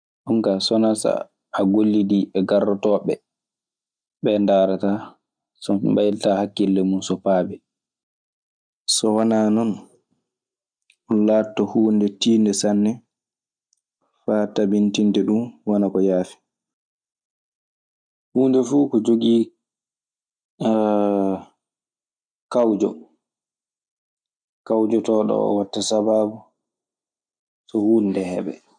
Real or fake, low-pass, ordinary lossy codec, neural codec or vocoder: real; 9.9 kHz; none; none